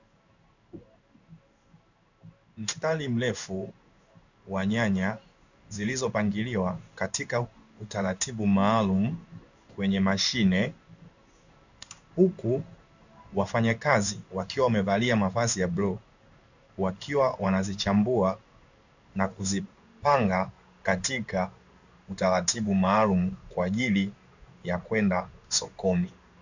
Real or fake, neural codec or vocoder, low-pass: fake; codec, 16 kHz in and 24 kHz out, 1 kbps, XY-Tokenizer; 7.2 kHz